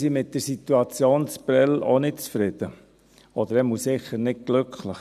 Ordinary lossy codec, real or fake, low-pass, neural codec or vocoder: none; real; 14.4 kHz; none